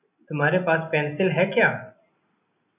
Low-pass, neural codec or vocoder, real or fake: 3.6 kHz; none; real